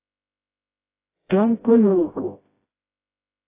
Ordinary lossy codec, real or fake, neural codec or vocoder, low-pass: AAC, 24 kbps; fake; codec, 16 kHz, 0.5 kbps, FreqCodec, smaller model; 3.6 kHz